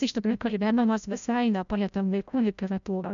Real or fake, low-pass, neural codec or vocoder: fake; 7.2 kHz; codec, 16 kHz, 0.5 kbps, FreqCodec, larger model